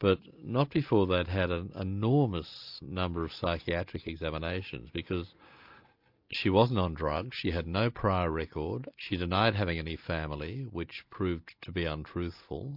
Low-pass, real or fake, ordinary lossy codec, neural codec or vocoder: 5.4 kHz; real; MP3, 48 kbps; none